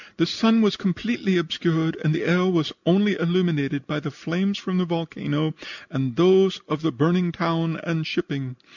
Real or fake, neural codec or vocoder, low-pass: real; none; 7.2 kHz